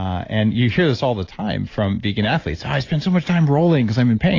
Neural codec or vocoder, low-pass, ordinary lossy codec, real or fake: none; 7.2 kHz; AAC, 32 kbps; real